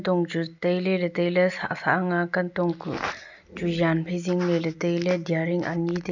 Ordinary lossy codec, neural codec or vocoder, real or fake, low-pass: none; none; real; 7.2 kHz